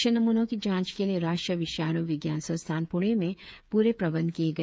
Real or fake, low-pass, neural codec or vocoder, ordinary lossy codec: fake; none; codec, 16 kHz, 8 kbps, FreqCodec, smaller model; none